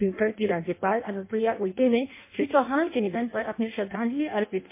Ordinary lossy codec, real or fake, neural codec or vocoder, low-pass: MP3, 24 kbps; fake; codec, 16 kHz in and 24 kHz out, 0.6 kbps, FireRedTTS-2 codec; 3.6 kHz